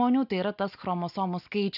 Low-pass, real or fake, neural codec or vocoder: 5.4 kHz; real; none